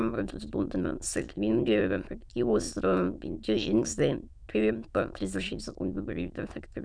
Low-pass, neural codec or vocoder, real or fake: 9.9 kHz; autoencoder, 22.05 kHz, a latent of 192 numbers a frame, VITS, trained on many speakers; fake